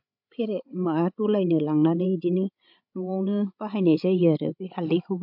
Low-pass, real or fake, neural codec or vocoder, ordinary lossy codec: 5.4 kHz; fake; codec, 16 kHz, 16 kbps, FreqCodec, larger model; MP3, 48 kbps